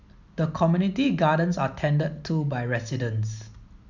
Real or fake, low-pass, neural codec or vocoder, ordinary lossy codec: real; 7.2 kHz; none; none